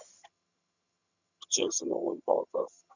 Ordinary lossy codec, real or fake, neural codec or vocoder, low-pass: none; fake; vocoder, 22.05 kHz, 80 mel bands, HiFi-GAN; 7.2 kHz